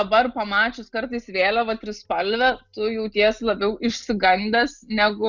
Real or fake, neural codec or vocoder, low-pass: real; none; 7.2 kHz